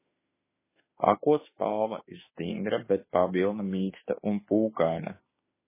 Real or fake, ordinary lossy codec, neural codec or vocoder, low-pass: fake; MP3, 16 kbps; autoencoder, 48 kHz, 32 numbers a frame, DAC-VAE, trained on Japanese speech; 3.6 kHz